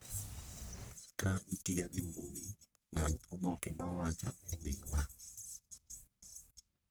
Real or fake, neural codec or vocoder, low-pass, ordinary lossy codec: fake; codec, 44.1 kHz, 1.7 kbps, Pupu-Codec; none; none